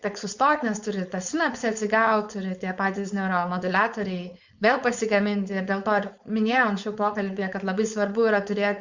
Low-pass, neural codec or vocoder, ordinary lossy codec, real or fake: 7.2 kHz; codec, 16 kHz, 4.8 kbps, FACodec; Opus, 64 kbps; fake